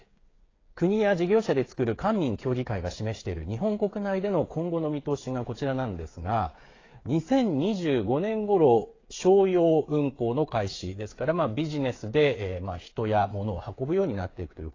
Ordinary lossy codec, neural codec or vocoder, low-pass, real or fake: AAC, 32 kbps; codec, 16 kHz, 8 kbps, FreqCodec, smaller model; 7.2 kHz; fake